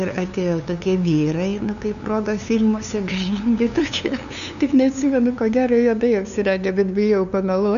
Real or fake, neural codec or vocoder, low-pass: fake; codec, 16 kHz, 2 kbps, FunCodec, trained on LibriTTS, 25 frames a second; 7.2 kHz